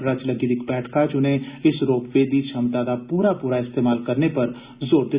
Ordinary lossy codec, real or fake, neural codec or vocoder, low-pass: Opus, 64 kbps; real; none; 3.6 kHz